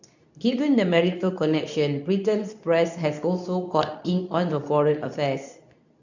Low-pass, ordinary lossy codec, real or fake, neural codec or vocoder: 7.2 kHz; none; fake; codec, 24 kHz, 0.9 kbps, WavTokenizer, medium speech release version 2